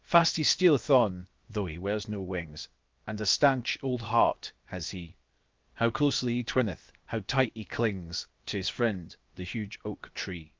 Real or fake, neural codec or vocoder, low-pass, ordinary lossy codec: fake; codec, 16 kHz, 0.7 kbps, FocalCodec; 7.2 kHz; Opus, 32 kbps